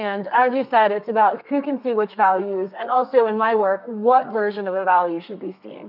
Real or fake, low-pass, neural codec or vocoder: fake; 5.4 kHz; codec, 32 kHz, 1.9 kbps, SNAC